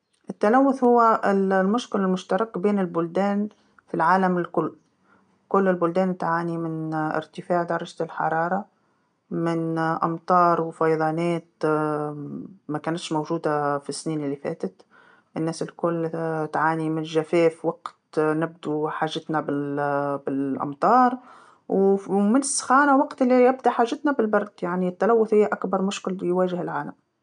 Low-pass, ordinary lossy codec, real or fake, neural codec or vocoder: 9.9 kHz; none; real; none